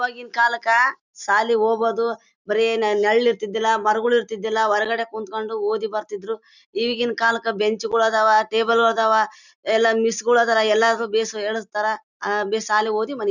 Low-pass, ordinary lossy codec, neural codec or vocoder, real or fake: 7.2 kHz; none; none; real